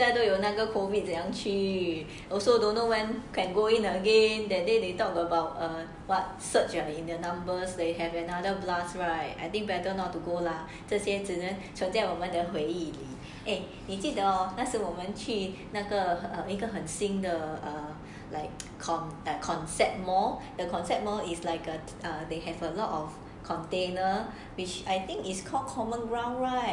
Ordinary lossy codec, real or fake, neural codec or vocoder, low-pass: none; real; none; 10.8 kHz